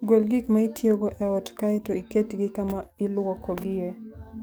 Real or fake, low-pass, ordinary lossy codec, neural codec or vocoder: fake; none; none; codec, 44.1 kHz, 7.8 kbps, DAC